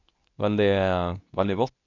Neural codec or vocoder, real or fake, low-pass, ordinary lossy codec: codec, 24 kHz, 0.9 kbps, WavTokenizer, medium speech release version 2; fake; 7.2 kHz; none